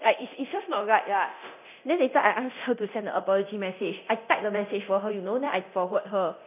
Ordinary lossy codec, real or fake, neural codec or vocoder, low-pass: none; fake; codec, 24 kHz, 0.9 kbps, DualCodec; 3.6 kHz